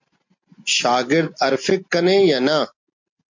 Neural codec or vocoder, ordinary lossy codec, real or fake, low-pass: none; MP3, 48 kbps; real; 7.2 kHz